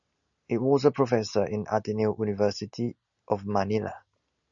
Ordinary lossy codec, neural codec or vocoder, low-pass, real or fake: MP3, 96 kbps; none; 7.2 kHz; real